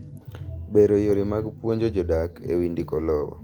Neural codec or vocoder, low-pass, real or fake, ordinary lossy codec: none; 19.8 kHz; real; Opus, 24 kbps